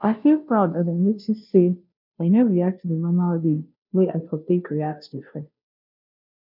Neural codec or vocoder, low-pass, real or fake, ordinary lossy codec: codec, 16 kHz, 0.5 kbps, FunCodec, trained on Chinese and English, 25 frames a second; 5.4 kHz; fake; none